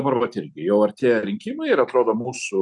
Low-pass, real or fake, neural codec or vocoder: 10.8 kHz; real; none